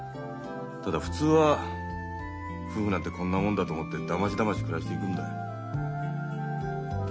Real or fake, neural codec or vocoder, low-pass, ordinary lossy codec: real; none; none; none